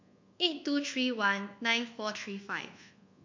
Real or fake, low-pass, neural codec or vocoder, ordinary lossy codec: fake; 7.2 kHz; codec, 24 kHz, 1.2 kbps, DualCodec; MP3, 48 kbps